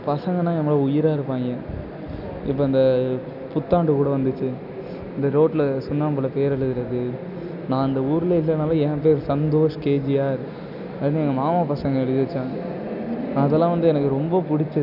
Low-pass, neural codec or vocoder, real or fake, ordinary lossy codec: 5.4 kHz; none; real; none